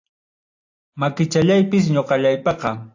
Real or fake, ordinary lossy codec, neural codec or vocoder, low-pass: real; AAC, 48 kbps; none; 7.2 kHz